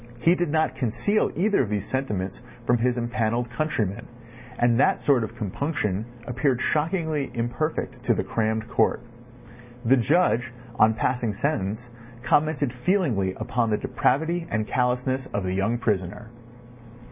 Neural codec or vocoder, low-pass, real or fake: none; 3.6 kHz; real